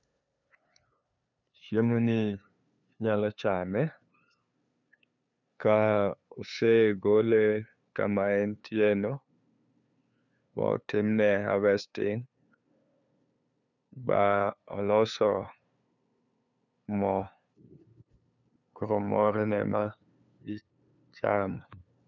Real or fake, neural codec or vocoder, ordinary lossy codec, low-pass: fake; codec, 16 kHz, 2 kbps, FunCodec, trained on LibriTTS, 25 frames a second; none; 7.2 kHz